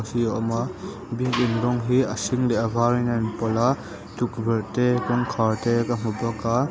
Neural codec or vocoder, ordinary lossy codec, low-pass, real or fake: none; none; none; real